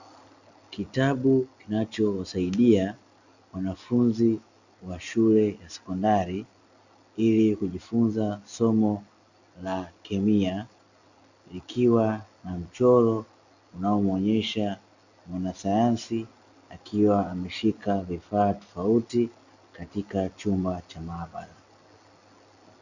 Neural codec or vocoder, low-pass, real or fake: none; 7.2 kHz; real